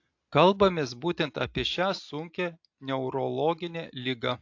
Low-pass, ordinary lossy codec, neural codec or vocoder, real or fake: 7.2 kHz; AAC, 48 kbps; none; real